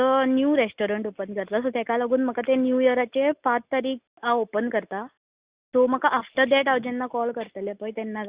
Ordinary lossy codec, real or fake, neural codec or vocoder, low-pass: Opus, 64 kbps; real; none; 3.6 kHz